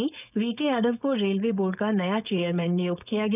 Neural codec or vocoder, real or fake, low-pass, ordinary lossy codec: codec, 16 kHz, 4.8 kbps, FACodec; fake; 3.6 kHz; none